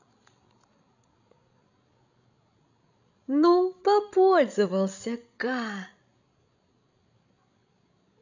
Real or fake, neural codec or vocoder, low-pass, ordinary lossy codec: fake; codec, 16 kHz, 16 kbps, FreqCodec, larger model; 7.2 kHz; none